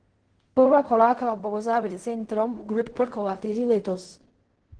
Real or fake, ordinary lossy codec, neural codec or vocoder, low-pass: fake; Opus, 16 kbps; codec, 16 kHz in and 24 kHz out, 0.4 kbps, LongCat-Audio-Codec, fine tuned four codebook decoder; 9.9 kHz